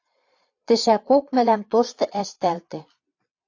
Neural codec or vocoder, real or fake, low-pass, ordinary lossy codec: vocoder, 22.05 kHz, 80 mel bands, Vocos; fake; 7.2 kHz; AAC, 48 kbps